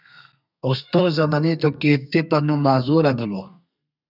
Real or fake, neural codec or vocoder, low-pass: fake; codec, 32 kHz, 1.9 kbps, SNAC; 5.4 kHz